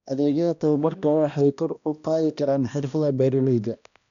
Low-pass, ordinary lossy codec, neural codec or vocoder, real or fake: 7.2 kHz; none; codec, 16 kHz, 1 kbps, X-Codec, HuBERT features, trained on balanced general audio; fake